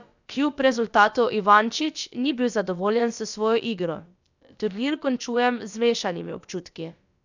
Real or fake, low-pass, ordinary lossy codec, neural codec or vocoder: fake; 7.2 kHz; none; codec, 16 kHz, about 1 kbps, DyCAST, with the encoder's durations